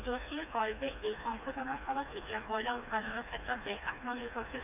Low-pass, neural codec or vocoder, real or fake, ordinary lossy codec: 3.6 kHz; codec, 16 kHz, 2 kbps, FreqCodec, smaller model; fake; none